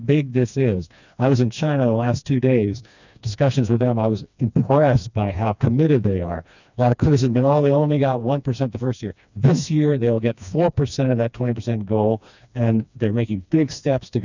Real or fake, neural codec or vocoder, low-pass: fake; codec, 16 kHz, 2 kbps, FreqCodec, smaller model; 7.2 kHz